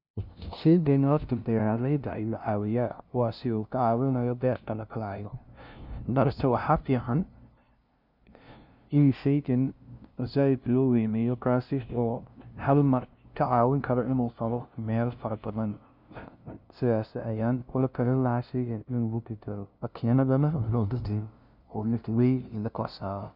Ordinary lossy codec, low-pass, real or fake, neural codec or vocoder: none; 5.4 kHz; fake; codec, 16 kHz, 0.5 kbps, FunCodec, trained on LibriTTS, 25 frames a second